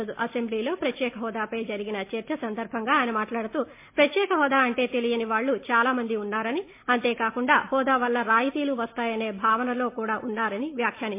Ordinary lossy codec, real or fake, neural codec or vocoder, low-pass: MP3, 24 kbps; real; none; 3.6 kHz